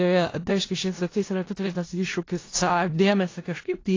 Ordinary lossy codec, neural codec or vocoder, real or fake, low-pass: AAC, 32 kbps; codec, 16 kHz in and 24 kHz out, 0.4 kbps, LongCat-Audio-Codec, four codebook decoder; fake; 7.2 kHz